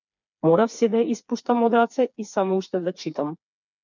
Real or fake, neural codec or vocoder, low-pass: fake; codec, 16 kHz, 4 kbps, FreqCodec, smaller model; 7.2 kHz